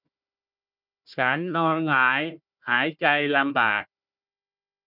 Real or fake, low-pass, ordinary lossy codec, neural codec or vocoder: fake; 5.4 kHz; none; codec, 16 kHz, 1 kbps, FunCodec, trained on Chinese and English, 50 frames a second